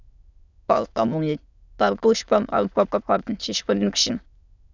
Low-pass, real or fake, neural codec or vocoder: 7.2 kHz; fake; autoencoder, 22.05 kHz, a latent of 192 numbers a frame, VITS, trained on many speakers